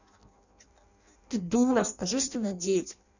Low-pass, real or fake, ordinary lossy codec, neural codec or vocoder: 7.2 kHz; fake; none; codec, 16 kHz in and 24 kHz out, 0.6 kbps, FireRedTTS-2 codec